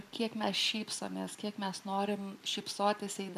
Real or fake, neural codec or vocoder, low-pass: real; none; 14.4 kHz